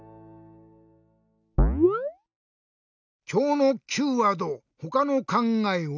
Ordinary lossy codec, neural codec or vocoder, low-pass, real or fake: none; none; 7.2 kHz; real